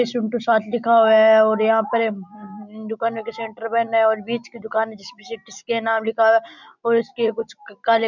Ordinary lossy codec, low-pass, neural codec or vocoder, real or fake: none; 7.2 kHz; none; real